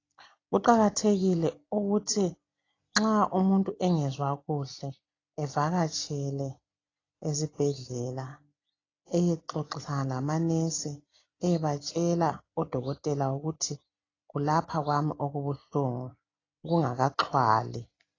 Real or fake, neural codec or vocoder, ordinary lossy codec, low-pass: real; none; AAC, 32 kbps; 7.2 kHz